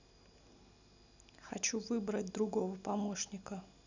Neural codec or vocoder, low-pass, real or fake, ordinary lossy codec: none; 7.2 kHz; real; none